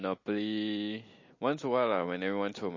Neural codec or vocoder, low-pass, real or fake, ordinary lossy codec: none; 7.2 kHz; real; MP3, 32 kbps